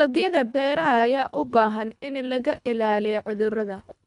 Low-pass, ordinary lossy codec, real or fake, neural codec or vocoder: 10.8 kHz; none; fake; codec, 24 kHz, 1.5 kbps, HILCodec